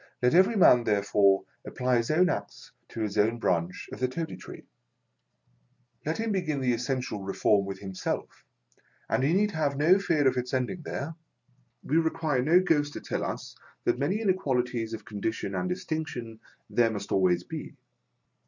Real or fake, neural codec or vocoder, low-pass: real; none; 7.2 kHz